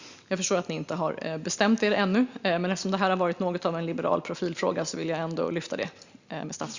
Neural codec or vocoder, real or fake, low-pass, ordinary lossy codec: none; real; 7.2 kHz; Opus, 64 kbps